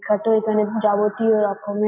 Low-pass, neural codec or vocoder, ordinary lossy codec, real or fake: 3.6 kHz; none; MP3, 32 kbps; real